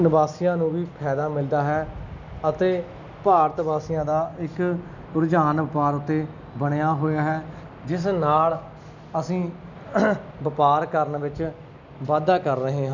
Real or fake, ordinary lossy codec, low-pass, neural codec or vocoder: real; none; 7.2 kHz; none